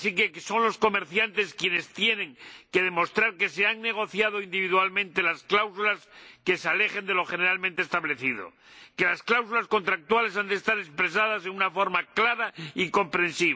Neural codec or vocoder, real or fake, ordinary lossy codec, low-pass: none; real; none; none